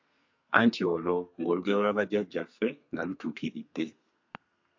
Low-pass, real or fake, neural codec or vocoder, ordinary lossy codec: 7.2 kHz; fake; codec, 32 kHz, 1.9 kbps, SNAC; MP3, 48 kbps